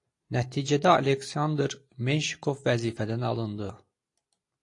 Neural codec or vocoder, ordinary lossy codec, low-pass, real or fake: none; AAC, 48 kbps; 10.8 kHz; real